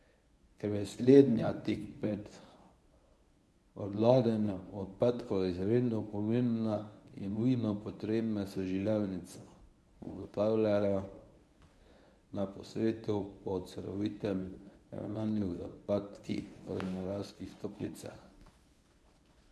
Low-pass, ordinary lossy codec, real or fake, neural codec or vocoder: none; none; fake; codec, 24 kHz, 0.9 kbps, WavTokenizer, medium speech release version 1